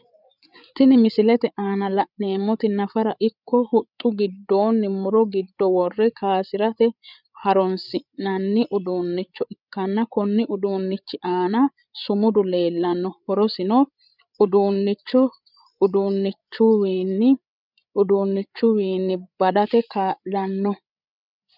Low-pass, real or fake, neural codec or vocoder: 5.4 kHz; fake; codec, 16 kHz, 8 kbps, FreqCodec, larger model